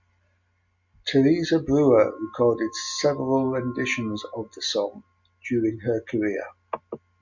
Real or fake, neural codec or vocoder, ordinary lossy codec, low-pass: real; none; MP3, 64 kbps; 7.2 kHz